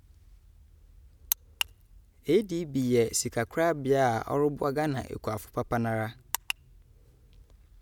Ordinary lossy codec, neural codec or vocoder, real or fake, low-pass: none; none; real; none